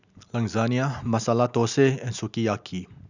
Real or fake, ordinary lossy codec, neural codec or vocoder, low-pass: real; none; none; 7.2 kHz